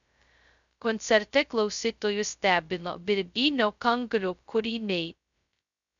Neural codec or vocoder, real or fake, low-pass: codec, 16 kHz, 0.2 kbps, FocalCodec; fake; 7.2 kHz